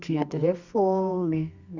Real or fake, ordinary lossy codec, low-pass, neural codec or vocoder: fake; Opus, 64 kbps; 7.2 kHz; codec, 24 kHz, 0.9 kbps, WavTokenizer, medium music audio release